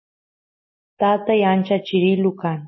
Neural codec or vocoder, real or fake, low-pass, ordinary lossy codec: none; real; 7.2 kHz; MP3, 24 kbps